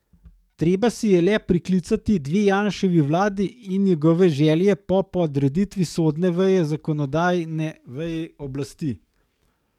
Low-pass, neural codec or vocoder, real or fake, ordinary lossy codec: 19.8 kHz; codec, 44.1 kHz, 7.8 kbps, DAC; fake; MP3, 96 kbps